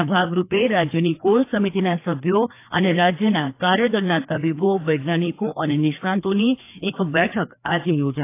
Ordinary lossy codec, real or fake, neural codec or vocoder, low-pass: AAC, 24 kbps; fake; codec, 16 kHz, 2 kbps, FreqCodec, larger model; 3.6 kHz